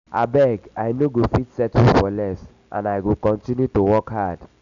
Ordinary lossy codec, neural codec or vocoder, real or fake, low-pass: none; none; real; 7.2 kHz